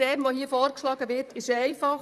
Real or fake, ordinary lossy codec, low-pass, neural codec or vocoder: fake; none; 14.4 kHz; vocoder, 44.1 kHz, 128 mel bands, Pupu-Vocoder